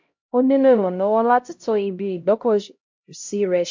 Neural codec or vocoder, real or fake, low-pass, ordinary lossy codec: codec, 16 kHz, 0.5 kbps, X-Codec, HuBERT features, trained on LibriSpeech; fake; 7.2 kHz; MP3, 48 kbps